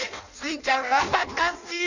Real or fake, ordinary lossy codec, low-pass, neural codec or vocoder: fake; none; 7.2 kHz; codec, 16 kHz in and 24 kHz out, 0.6 kbps, FireRedTTS-2 codec